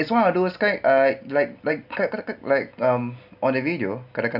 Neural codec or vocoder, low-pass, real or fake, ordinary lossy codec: none; 5.4 kHz; real; none